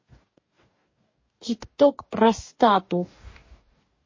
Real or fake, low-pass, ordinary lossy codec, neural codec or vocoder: fake; 7.2 kHz; MP3, 32 kbps; codec, 44.1 kHz, 2.6 kbps, DAC